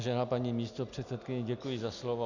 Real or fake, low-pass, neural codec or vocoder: real; 7.2 kHz; none